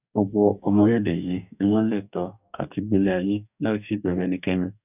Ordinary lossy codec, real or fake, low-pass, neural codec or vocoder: none; fake; 3.6 kHz; codec, 44.1 kHz, 2.6 kbps, DAC